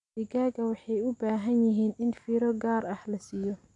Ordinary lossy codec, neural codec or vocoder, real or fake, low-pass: AAC, 64 kbps; none; real; 10.8 kHz